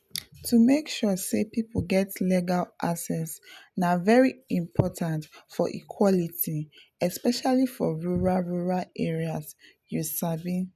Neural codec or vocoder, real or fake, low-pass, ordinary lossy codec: none; real; 14.4 kHz; none